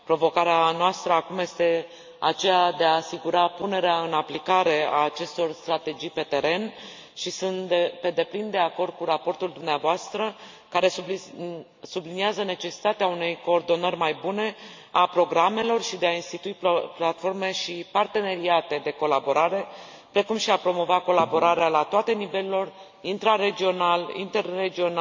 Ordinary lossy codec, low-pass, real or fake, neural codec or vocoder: MP3, 48 kbps; 7.2 kHz; real; none